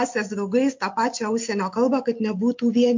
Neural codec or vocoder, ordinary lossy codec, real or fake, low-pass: none; MP3, 48 kbps; real; 7.2 kHz